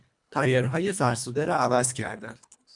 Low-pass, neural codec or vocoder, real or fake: 10.8 kHz; codec, 24 kHz, 1.5 kbps, HILCodec; fake